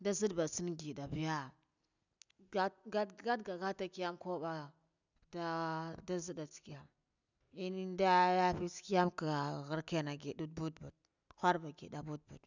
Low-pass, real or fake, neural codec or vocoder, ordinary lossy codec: 7.2 kHz; real; none; none